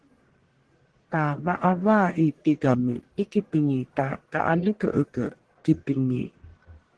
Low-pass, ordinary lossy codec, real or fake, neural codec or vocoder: 10.8 kHz; Opus, 16 kbps; fake; codec, 44.1 kHz, 1.7 kbps, Pupu-Codec